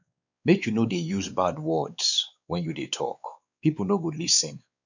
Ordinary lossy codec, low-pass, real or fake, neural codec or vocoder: none; 7.2 kHz; fake; codec, 16 kHz, 4 kbps, X-Codec, WavLM features, trained on Multilingual LibriSpeech